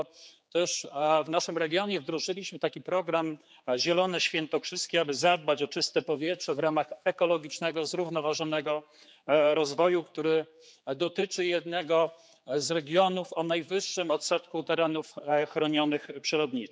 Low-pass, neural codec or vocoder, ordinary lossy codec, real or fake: none; codec, 16 kHz, 4 kbps, X-Codec, HuBERT features, trained on general audio; none; fake